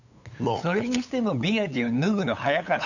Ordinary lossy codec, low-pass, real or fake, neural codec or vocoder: none; 7.2 kHz; fake; codec, 16 kHz, 8 kbps, FunCodec, trained on LibriTTS, 25 frames a second